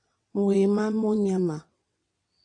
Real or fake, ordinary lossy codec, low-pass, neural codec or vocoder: fake; AAC, 48 kbps; 9.9 kHz; vocoder, 22.05 kHz, 80 mel bands, WaveNeXt